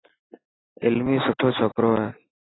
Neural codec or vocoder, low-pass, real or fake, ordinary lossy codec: none; 7.2 kHz; real; AAC, 16 kbps